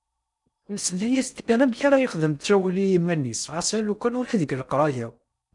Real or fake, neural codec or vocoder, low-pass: fake; codec, 16 kHz in and 24 kHz out, 0.6 kbps, FocalCodec, streaming, 4096 codes; 10.8 kHz